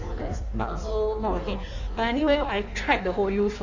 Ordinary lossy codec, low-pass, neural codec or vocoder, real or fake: none; 7.2 kHz; codec, 16 kHz in and 24 kHz out, 1.1 kbps, FireRedTTS-2 codec; fake